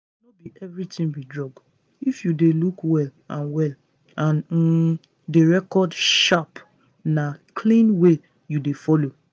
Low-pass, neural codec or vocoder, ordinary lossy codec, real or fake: none; none; none; real